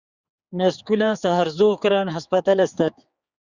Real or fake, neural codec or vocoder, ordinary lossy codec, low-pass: fake; codec, 16 kHz, 4 kbps, X-Codec, HuBERT features, trained on general audio; Opus, 64 kbps; 7.2 kHz